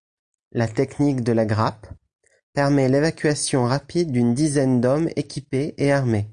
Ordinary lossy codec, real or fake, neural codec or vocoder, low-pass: Opus, 64 kbps; real; none; 9.9 kHz